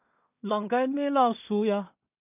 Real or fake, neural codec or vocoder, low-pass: fake; codec, 16 kHz in and 24 kHz out, 0.4 kbps, LongCat-Audio-Codec, two codebook decoder; 3.6 kHz